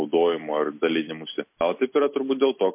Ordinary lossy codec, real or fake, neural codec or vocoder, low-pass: MP3, 24 kbps; real; none; 3.6 kHz